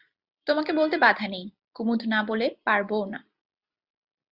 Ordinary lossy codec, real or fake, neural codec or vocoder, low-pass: Opus, 64 kbps; real; none; 5.4 kHz